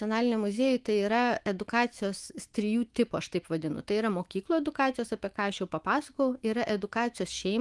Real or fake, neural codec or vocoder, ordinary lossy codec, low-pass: fake; autoencoder, 48 kHz, 128 numbers a frame, DAC-VAE, trained on Japanese speech; Opus, 24 kbps; 10.8 kHz